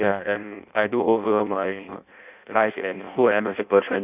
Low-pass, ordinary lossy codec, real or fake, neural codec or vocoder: 3.6 kHz; none; fake; codec, 16 kHz in and 24 kHz out, 0.6 kbps, FireRedTTS-2 codec